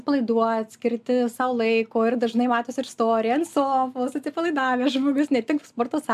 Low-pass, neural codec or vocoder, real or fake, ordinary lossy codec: 14.4 kHz; none; real; MP3, 96 kbps